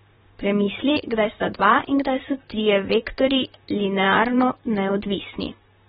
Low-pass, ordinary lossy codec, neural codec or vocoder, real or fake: 19.8 kHz; AAC, 16 kbps; vocoder, 44.1 kHz, 128 mel bands, Pupu-Vocoder; fake